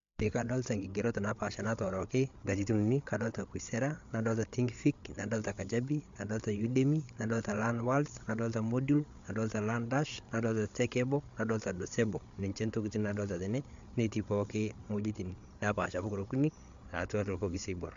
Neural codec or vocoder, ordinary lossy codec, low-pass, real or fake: codec, 16 kHz, 8 kbps, FreqCodec, larger model; MP3, 64 kbps; 7.2 kHz; fake